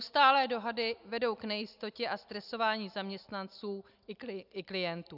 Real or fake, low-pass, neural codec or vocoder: real; 5.4 kHz; none